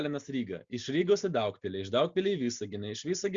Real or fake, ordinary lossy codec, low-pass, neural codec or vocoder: real; Opus, 64 kbps; 7.2 kHz; none